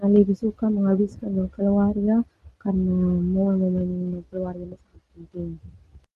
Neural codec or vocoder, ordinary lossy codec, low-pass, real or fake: none; Opus, 24 kbps; 14.4 kHz; real